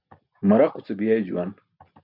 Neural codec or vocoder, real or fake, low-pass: none; real; 5.4 kHz